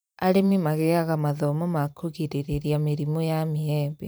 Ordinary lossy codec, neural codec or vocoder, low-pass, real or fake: none; vocoder, 44.1 kHz, 128 mel bands every 512 samples, BigVGAN v2; none; fake